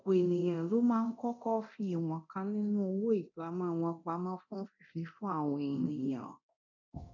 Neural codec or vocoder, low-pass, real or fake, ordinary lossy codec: codec, 24 kHz, 0.9 kbps, DualCodec; 7.2 kHz; fake; none